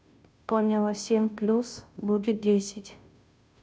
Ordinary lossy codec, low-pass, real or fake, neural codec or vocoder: none; none; fake; codec, 16 kHz, 0.5 kbps, FunCodec, trained on Chinese and English, 25 frames a second